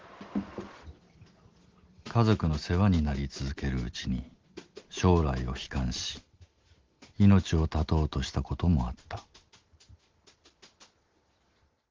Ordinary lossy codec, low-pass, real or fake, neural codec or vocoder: Opus, 16 kbps; 7.2 kHz; real; none